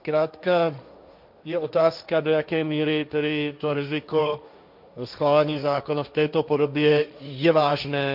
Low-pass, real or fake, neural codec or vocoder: 5.4 kHz; fake; codec, 16 kHz, 1.1 kbps, Voila-Tokenizer